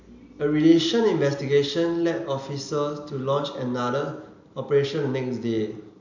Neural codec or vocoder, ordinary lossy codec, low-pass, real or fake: none; none; 7.2 kHz; real